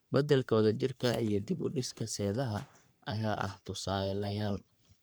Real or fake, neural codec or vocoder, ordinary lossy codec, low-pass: fake; codec, 44.1 kHz, 3.4 kbps, Pupu-Codec; none; none